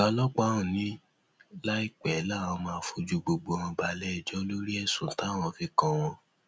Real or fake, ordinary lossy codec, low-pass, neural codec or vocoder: real; none; none; none